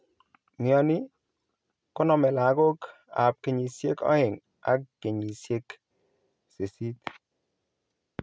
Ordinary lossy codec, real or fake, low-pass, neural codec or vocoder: none; real; none; none